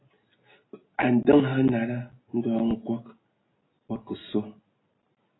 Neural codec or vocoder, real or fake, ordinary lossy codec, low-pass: none; real; AAC, 16 kbps; 7.2 kHz